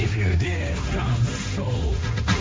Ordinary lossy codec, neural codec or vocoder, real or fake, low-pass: none; codec, 16 kHz, 1.1 kbps, Voila-Tokenizer; fake; none